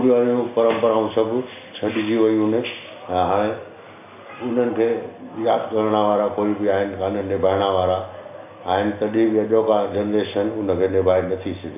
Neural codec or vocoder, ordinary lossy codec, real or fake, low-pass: none; none; real; 3.6 kHz